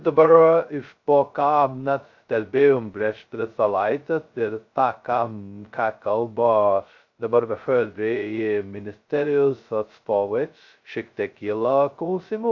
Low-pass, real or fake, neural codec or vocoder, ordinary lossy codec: 7.2 kHz; fake; codec, 16 kHz, 0.2 kbps, FocalCodec; AAC, 48 kbps